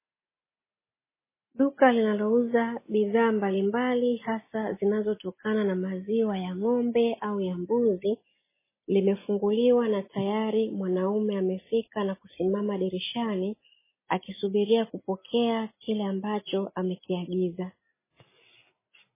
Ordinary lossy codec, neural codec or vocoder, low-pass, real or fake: MP3, 16 kbps; none; 3.6 kHz; real